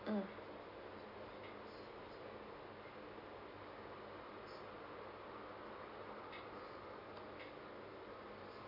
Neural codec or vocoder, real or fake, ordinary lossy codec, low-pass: none; real; AAC, 48 kbps; 5.4 kHz